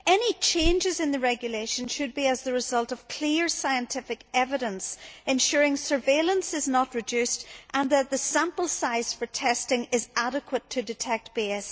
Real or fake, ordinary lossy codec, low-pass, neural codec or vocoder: real; none; none; none